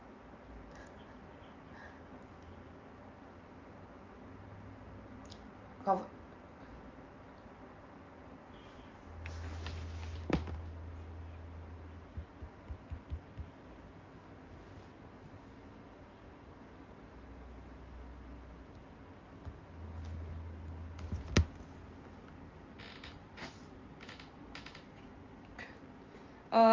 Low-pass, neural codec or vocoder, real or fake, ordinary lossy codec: 7.2 kHz; none; real; Opus, 24 kbps